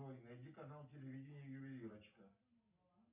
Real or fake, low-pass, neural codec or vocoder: real; 3.6 kHz; none